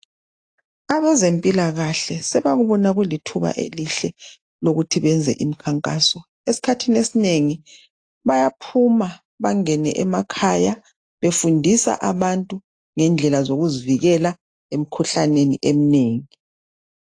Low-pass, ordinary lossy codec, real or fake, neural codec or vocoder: 9.9 kHz; AAC, 48 kbps; real; none